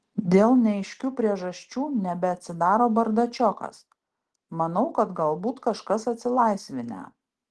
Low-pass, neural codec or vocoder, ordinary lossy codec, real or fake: 10.8 kHz; none; Opus, 16 kbps; real